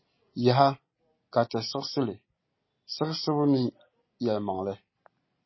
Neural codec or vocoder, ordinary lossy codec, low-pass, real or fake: none; MP3, 24 kbps; 7.2 kHz; real